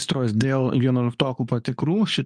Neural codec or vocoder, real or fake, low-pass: codec, 24 kHz, 0.9 kbps, WavTokenizer, medium speech release version 2; fake; 9.9 kHz